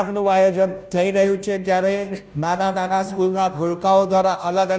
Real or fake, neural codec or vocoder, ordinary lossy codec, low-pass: fake; codec, 16 kHz, 0.5 kbps, FunCodec, trained on Chinese and English, 25 frames a second; none; none